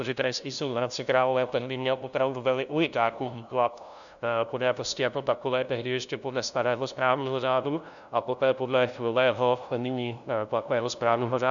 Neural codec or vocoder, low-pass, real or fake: codec, 16 kHz, 0.5 kbps, FunCodec, trained on LibriTTS, 25 frames a second; 7.2 kHz; fake